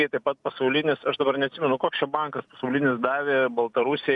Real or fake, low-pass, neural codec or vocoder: real; 10.8 kHz; none